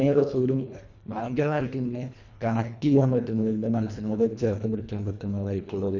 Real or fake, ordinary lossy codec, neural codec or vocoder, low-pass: fake; none; codec, 24 kHz, 1.5 kbps, HILCodec; 7.2 kHz